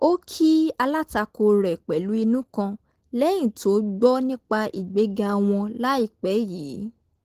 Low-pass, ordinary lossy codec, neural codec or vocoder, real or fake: 14.4 kHz; Opus, 16 kbps; none; real